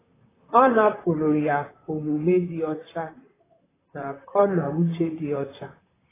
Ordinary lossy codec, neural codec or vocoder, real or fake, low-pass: AAC, 16 kbps; vocoder, 44.1 kHz, 128 mel bands, Pupu-Vocoder; fake; 3.6 kHz